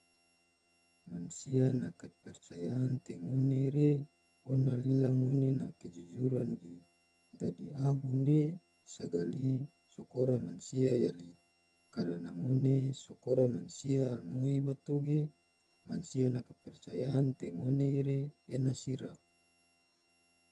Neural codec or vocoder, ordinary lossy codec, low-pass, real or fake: vocoder, 22.05 kHz, 80 mel bands, HiFi-GAN; none; none; fake